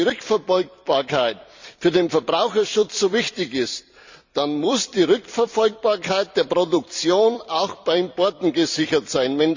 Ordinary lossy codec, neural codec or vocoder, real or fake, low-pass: Opus, 64 kbps; vocoder, 44.1 kHz, 128 mel bands every 256 samples, BigVGAN v2; fake; 7.2 kHz